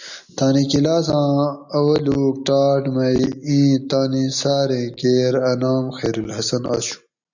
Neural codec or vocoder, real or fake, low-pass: none; real; 7.2 kHz